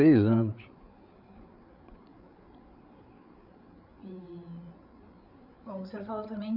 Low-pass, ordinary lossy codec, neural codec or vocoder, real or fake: 5.4 kHz; none; codec, 16 kHz, 8 kbps, FreqCodec, larger model; fake